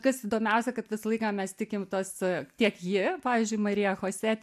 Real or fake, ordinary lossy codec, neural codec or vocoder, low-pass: real; MP3, 96 kbps; none; 14.4 kHz